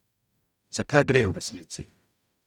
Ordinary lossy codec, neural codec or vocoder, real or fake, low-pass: none; codec, 44.1 kHz, 0.9 kbps, DAC; fake; 19.8 kHz